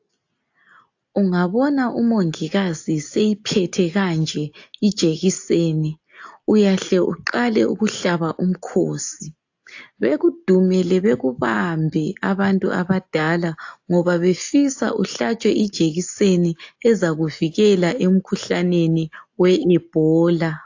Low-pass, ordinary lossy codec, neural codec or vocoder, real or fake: 7.2 kHz; AAC, 48 kbps; none; real